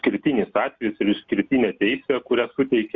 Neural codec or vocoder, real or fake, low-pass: none; real; 7.2 kHz